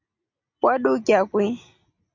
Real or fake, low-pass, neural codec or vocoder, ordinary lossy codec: real; 7.2 kHz; none; AAC, 48 kbps